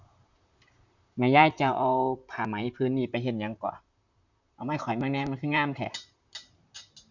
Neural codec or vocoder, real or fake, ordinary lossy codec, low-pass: vocoder, 44.1 kHz, 128 mel bands, Pupu-Vocoder; fake; none; 7.2 kHz